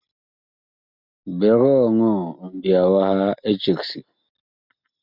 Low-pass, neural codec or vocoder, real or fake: 5.4 kHz; none; real